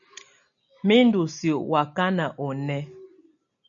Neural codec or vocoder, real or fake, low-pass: none; real; 7.2 kHz